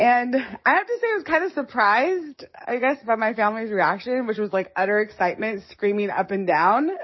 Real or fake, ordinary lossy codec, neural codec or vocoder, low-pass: fake; MP3, 24 kbps; codec, 44.1 kHz, 7.8 kbps, DAC; 7.2 kHz